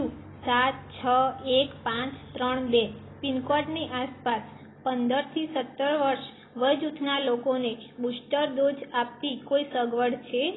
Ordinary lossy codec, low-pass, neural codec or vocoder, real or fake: AAC, 16 kbps; 7.2 kHz; none; real